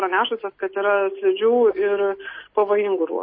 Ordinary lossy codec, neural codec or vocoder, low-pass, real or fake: MP3, 24 kbps; none; 7.2 kHz; real